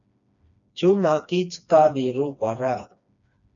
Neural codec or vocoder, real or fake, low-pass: codec, 16 kHz, 2 kbps, FreqCodec, smaller model; fake; 7.2 kHz